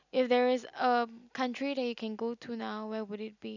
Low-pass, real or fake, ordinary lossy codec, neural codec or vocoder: 7.2 kHz; real; none; none